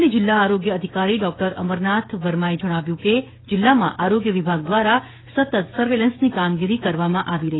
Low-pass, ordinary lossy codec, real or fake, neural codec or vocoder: 7.2 kHz; AAC, 16 kbps; fake; codec, 16 kHz, 16 kbps, FreqCodec, smaller model